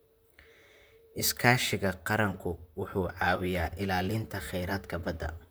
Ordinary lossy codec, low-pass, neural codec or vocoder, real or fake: none; none; vocoder, 44.1 kHz, 128 mel bands, Pupu-Vocoder; fake